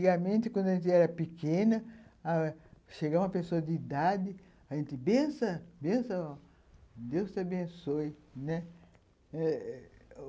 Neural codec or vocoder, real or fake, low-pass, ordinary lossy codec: none; real; none; none